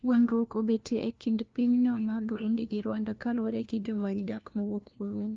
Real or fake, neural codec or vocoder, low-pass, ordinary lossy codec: fake; codec, 16 kHz, 1 kbps, FunCodec, trained on LibriTTS, 50 frames a second; 7.2 kHz; Opus, 32 kbps